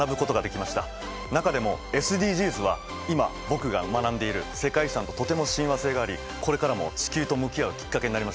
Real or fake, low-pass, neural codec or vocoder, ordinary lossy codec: real; none; none; none